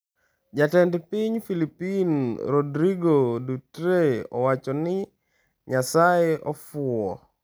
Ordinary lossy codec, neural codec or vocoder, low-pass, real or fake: none; none; none; real